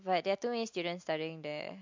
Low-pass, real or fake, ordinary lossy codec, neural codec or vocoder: 7.2 kHz; real; MP3, 48 kbps; none